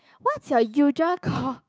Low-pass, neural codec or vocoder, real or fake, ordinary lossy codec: none; codec, 16 kHz, 6 kbps, DAC; fake; none